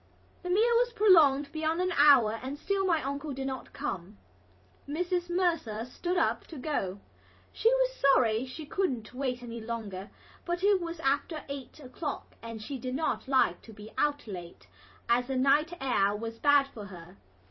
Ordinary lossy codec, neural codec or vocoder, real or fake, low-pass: MP3, 24 kbps; vocoder, 44.1 kHz, 128 mel bands every 256 samples, BigVGAN v2; fake; 7.2 kHz